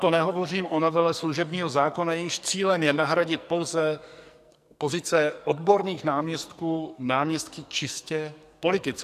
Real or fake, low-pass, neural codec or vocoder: fake; 14.4 kHz; codec, 44.1 kHz, 2.6 kbps, SNAC